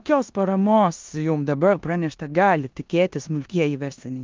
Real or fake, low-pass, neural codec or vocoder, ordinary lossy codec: fake; 7.2 kHz; codec, 16 kHz in and 24 kHz out, 0.9 kbps, LongCat-Audio-Codec, four codebook decoder; Opus, 24 kbps